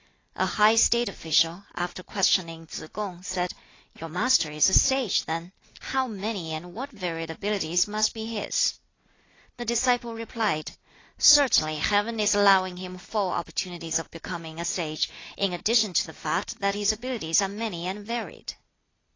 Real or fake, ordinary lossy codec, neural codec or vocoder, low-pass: real; AAC, 32 kbps; none; 7.2 kHz